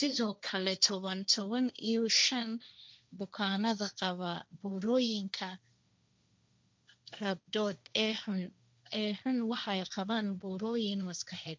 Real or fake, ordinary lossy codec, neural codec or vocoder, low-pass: fake; none; codec, 16 kHz, 1.1 kbps, Voila-Tokenizer; none